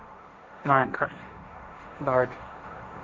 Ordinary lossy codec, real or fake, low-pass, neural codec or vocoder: none; fake; none; codec, 16 kHz, 1.1 kbps, Voila-Tokenizer